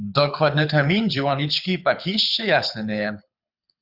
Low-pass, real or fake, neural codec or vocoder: 5.4 kHz; fake; codec, 24 kHz, 6 kbps, HILCodec